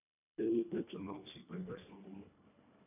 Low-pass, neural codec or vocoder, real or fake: 3.6 kHz; codec, 24 kHz, 1.5 kbps, HILCodec; fake